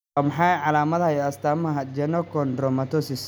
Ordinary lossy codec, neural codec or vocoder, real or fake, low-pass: none; none; real; none